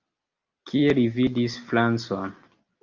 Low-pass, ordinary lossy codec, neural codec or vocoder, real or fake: 7.2 kHz; Opus, 24 kbps; none; real